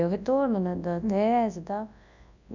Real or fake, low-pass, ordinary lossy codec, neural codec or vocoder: fake; 7.2 kHz; none; codec, 24 kHz, 0.9 kbps, WavTokenizer, large speech release